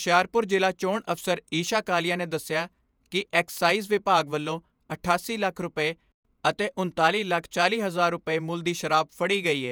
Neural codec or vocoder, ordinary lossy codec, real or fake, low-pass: vocoder, 48 kHz, 128 mel bands, Vocos; none; fake; none